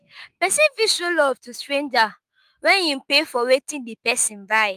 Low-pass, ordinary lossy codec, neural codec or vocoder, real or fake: 14.4 kHz; Opus, 32 kbps; autoencoder, 48 kHz, 128 numbers a frame, DAC-VAE, trained on Japanese speech; fake